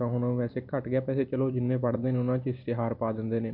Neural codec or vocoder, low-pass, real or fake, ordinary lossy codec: vocoder, 44.1 kHz, 128 mel bands every 256 samples, BigVGAN v2; 5.4 kHz; fake; none